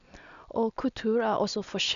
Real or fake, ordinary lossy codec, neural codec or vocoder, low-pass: real; none; none; 7.2 kHz